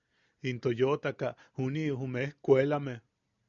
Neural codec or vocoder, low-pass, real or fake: none; 7.2 kHz; real